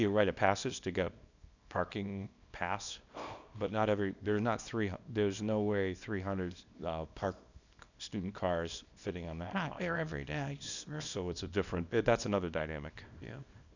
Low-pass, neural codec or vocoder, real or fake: 7.2 kHz; codec, 24 kHz, 0.9 kbps, WavTokenizer, small release; fake